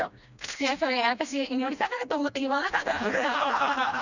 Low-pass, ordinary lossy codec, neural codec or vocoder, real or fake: 7.2 kHz; none; codec, 16 kHz, 1 kbps, FreqCodec, smaller model; fake